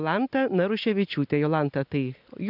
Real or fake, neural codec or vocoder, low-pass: real; none; 5.4 kHz